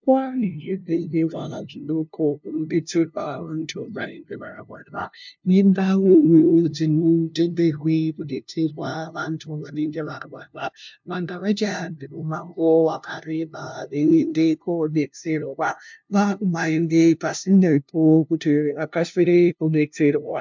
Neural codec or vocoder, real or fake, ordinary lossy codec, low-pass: codec, 16 kHz, 0.5 kbps, FunCodec, trained on LibriTTS, 25 frames a second; fake; MP3, 64 kbps; 7.2 kHz